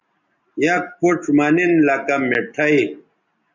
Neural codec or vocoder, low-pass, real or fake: none; 7.2 kHz; real